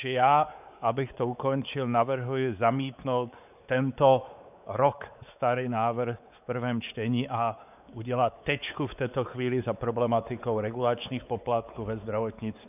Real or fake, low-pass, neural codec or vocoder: fake; 3.6 kHz; codec, 16 kHz, 4 kbps, X-Codec, WavLM features, trained on Multilingual LibriSpeech